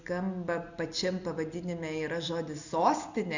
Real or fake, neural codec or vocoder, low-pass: real; none; 7.2 kHz